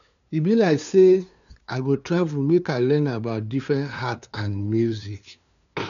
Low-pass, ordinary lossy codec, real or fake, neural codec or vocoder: 7.2 kHz; none; fake; codec, 16 kHz, 2 kbps, FunCodec, trained on LibriTTS, 25 frames a second